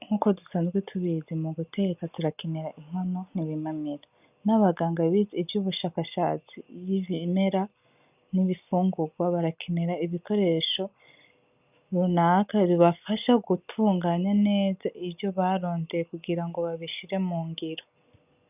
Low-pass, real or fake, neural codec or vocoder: 3.6 kHz; real; none